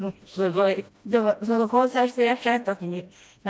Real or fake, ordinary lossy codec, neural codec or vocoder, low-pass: fake; none; codec, 16 kHz, 1 kbps, FreqCodec, smaller model; none